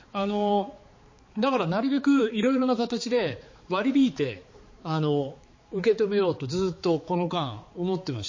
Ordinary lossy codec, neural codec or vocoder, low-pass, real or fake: MP3, 32 kbps; codec, 16 kHz, 4 kbps, X-Codec, HuBERT features, trained on balanced general audio; 7.2 kHz; fake